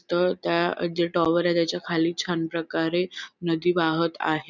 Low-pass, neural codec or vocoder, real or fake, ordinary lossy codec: 7.2 kHz; none; real; none